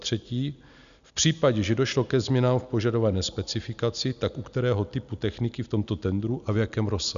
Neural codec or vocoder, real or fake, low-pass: none; real; 7.2 kHz